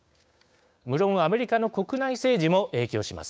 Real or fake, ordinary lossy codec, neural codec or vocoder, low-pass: fake; none; codec, 16 kHz, 6 kbps, DAC; none